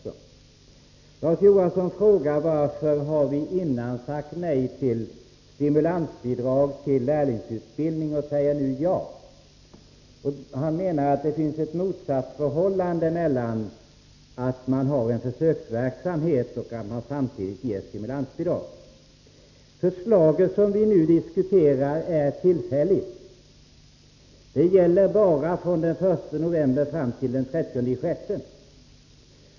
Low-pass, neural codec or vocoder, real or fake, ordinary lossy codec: 7.2 kHz; none; real; none